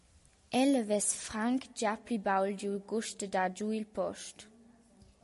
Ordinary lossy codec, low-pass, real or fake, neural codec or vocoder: MP3, 48 kbps; 14.4 kHz; real; none